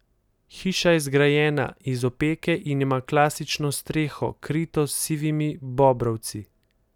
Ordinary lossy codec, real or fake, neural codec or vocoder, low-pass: none; real; none; 19.8 kHz